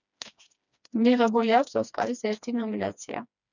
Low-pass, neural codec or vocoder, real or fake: 7.2 kHz; codec, 16 kHz, 2 kbps, FreqCodec, smaller model; fake